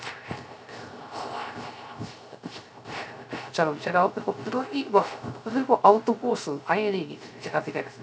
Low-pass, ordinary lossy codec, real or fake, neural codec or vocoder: none; none; fake; codec, 16 kHz, 0.3 kbps, FocalCodec